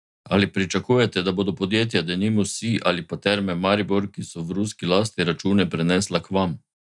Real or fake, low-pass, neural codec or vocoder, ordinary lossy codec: real; 10.8 kHz; none; none